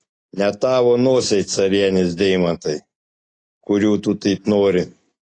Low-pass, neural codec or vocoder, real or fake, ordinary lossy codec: 9.9 kHz; none; real; AAC, 32 kbps